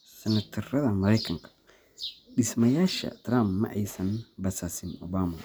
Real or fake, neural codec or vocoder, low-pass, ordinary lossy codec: real; none; none; none